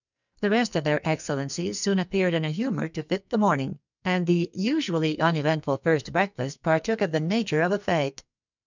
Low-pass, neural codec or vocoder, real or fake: 7.2 kHz; codec, 44.1 kHz, 2.6 kbps, SNAC; fake